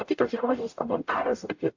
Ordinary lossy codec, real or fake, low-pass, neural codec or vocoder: AAC, 48 kbps; fake; 7.2 kHz; codec, 44.1 kHz, 0.9 kbps, DAC